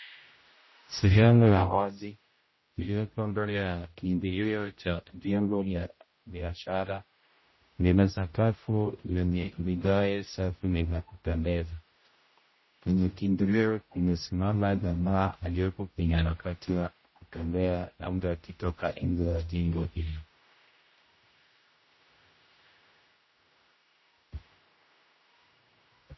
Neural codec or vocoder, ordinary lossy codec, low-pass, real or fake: codec, 16 kHz, 0.5 kbps, X-Codec, HuBERT features, trained on general audio; MP3, 24 kbps; 7.2 kHz; fake